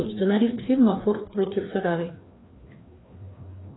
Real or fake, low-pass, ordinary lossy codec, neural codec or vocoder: fake; 7.2 kHz; AAC, 16 kbps; codec, 16 kHz, 2 kbps, FreqCodec, larger model